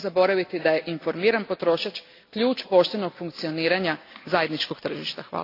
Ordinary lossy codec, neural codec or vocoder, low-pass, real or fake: AAC, 32 kbps; none; 5.4 kHz; real